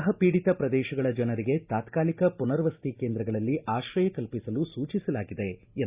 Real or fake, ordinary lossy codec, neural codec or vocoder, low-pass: real; Opus, 64 kbps; none; 3.6 kHz